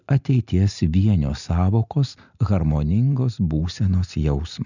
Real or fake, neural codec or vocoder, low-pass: real; none; 7.2 kHz